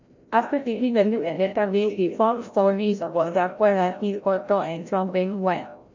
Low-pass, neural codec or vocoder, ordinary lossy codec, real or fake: 7.2 kHz; codec, 16 kHz, 0.5 kbps, FreqCodec, larger model; MP3, 64 kbps; fake